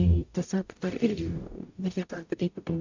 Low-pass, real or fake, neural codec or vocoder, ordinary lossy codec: 7.2 kHz; fake; codec, 44.1 kHz, 0.9 kbps, DAC; AAC, 48 kbps